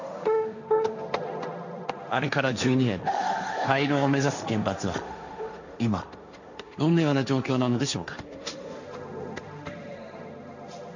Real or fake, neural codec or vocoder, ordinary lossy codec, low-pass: fake; codec, 16 kHz, 1.1 kbps, Voila-Tokenizer; none; 7.2 kHz